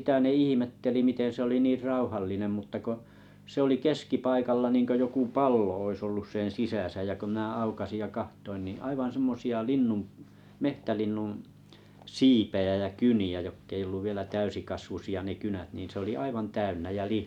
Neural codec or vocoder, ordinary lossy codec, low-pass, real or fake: none; none; 19.8 kHz; real